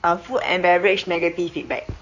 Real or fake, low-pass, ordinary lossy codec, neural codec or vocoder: fake; 7.2 kHz; none; codec, 16 kHz in and 24 kHz out, 2.2 kbps, FireRedTTS-2 codec